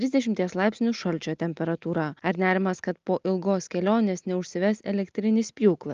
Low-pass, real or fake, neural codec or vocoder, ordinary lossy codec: 7.2 kHz; real; none; Opus, 32 kbps